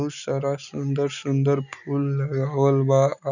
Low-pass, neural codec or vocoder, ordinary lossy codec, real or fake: 7.2 kHz; none; none; real